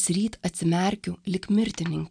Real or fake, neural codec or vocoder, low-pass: real; none; 9.9 kHz